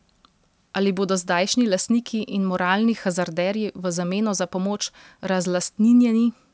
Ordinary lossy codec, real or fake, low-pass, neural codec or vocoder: none; real; none; none